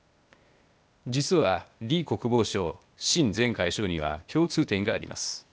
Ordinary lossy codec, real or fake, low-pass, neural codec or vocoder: none; fake; none; codec, 16 kHz, 0.8 kbps, ZipCodec